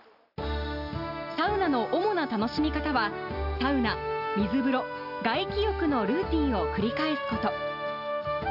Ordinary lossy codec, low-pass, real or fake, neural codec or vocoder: none; 5.4 kHz; real; none